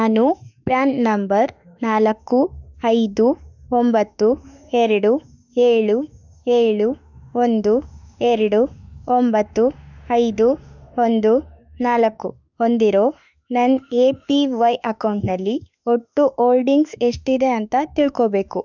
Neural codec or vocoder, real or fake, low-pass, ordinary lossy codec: autoencoder, 48 kHz, 32 numbers a frame, DAC-VAE, trained on Japanese speech; fake; 7.2 kHz; none